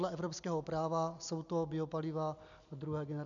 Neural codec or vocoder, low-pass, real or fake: none; 7.2 kHz; real